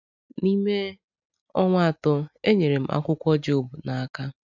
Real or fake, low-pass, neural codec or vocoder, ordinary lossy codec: real; 7.2 kHz; none; none